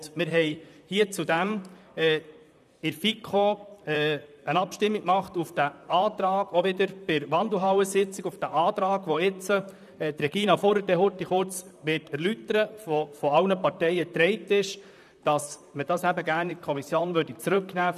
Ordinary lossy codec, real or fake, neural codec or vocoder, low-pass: none; fake; vocoder, 44.1 kHz, 128 mel bands, Pupu-Vocoder; 14.4 kHz